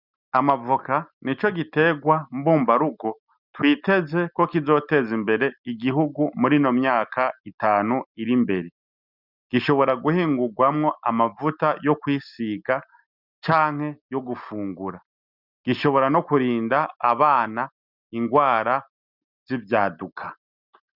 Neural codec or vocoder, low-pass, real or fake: none; 5.4 kHz; real